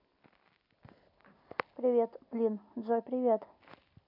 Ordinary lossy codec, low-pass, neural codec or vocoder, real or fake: AAC, 48 kbps; 5.4 kHz; none; real